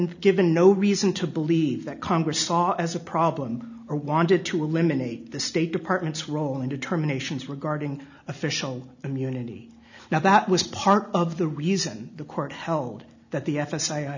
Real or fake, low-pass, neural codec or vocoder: real; 7.2 kHz; none